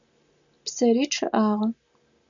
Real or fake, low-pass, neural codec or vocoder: real; 7.2 kHz; none